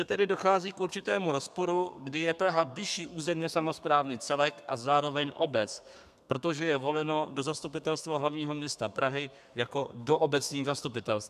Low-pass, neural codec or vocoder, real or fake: 14.4 kHz; codec, 32 kHz, 1.9 kbps, SNAC; fake